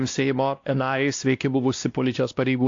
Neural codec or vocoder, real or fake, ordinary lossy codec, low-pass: codec, 16 kHz, 1 kbps, X-Codec, WavLM features, trained on Multilingual LibriSpeech; fake; AAC, 48 kbps; 7.2 kHz